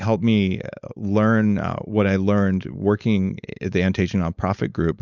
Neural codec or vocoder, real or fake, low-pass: codec, 16 kHz, 4.8 kbps, FACodec; fake; 7.2 kHz